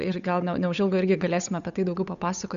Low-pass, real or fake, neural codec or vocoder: 7.2 kHz; fake; codec, 16 kHz, 8 kbps, FunCodec, trained on Chinese and English, 25 frames a second